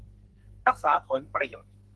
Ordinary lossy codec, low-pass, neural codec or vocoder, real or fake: Opus, 16 kbps; 10.8 kHz; codec, 44.1 kHz, 2.6 kbps, SNAC; fake